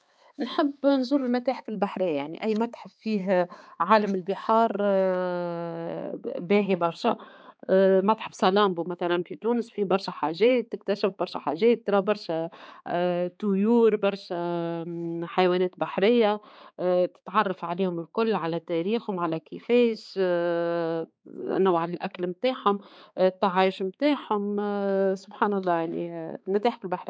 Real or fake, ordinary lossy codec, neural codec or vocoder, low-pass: fake; none; codec, 16 kHz, 4 kbps, X-Codec, HuBERT features, trained on balanced general audio; none